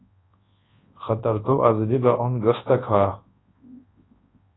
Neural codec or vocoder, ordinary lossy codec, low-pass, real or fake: codec, 24 kHz, 0.9 kbps, WavTokenizer, large speech release; AAC, 16 kbps; 7.2 kHz; fake